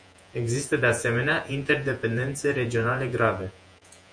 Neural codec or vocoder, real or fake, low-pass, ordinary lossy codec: vocoder, 48 kHz, 128 mel bands, Vocos; fake; 9.9 kHz; MP3, 64 kbps